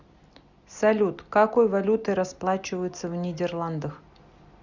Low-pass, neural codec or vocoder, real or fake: 7.2 kHz; none; real